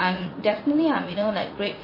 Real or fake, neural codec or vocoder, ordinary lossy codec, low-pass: fake; vocoder, 44.1 kHz, 80 mel bands, Vocos; MP3, 24 kbps; 5.4 kHz